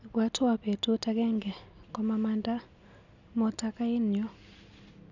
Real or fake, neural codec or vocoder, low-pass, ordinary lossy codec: real; none; 7.2 kHz; none